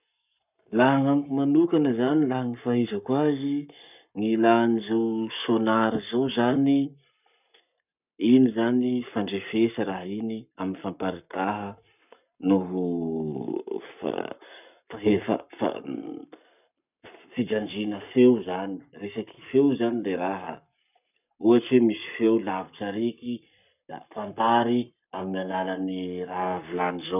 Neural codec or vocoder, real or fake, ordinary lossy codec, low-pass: codec, 44.1 kHz, 7.8 kbps, Pupu-Codec; fake; none; 3.6 kHz